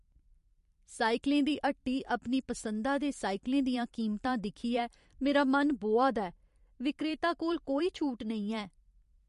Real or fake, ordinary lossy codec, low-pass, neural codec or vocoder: fake; MP3, 48 kbps; 14.4 kHz; vocoder, 44.1 kHz, 128 mel bands every 512 samples, BigVGAN v2